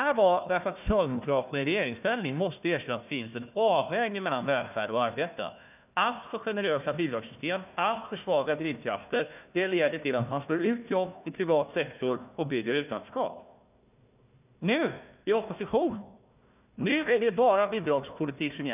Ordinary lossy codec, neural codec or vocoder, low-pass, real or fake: none; codec, 16 kHz, 1 kbps, FunCodec, trained on Chinese and English, 50 frames a second; 3.6 kHz; fake